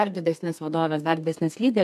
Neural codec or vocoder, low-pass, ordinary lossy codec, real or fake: codec, 32 kHz, 1.9 kbps, SNAC; 14.4 kHz; MP3, 96 kbps; fake